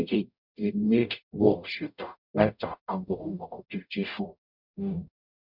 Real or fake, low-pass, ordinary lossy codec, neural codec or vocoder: fake; 5.4 kHz; none; codec, 44.1 kHz, 0.9 kbps, DAC